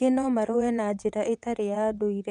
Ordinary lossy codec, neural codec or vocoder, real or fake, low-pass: none; vocoder, 22.05 kHz, 80 mel bands, WaveNeXt; fake; 9.9 kHz